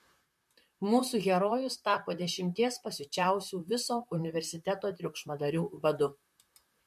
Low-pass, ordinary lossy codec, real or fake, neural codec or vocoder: 14.4 kHz; MP3, 64 kbps; fake; vocoder, 44.1 kHz, 128 mel bands, Pupu-Vocoder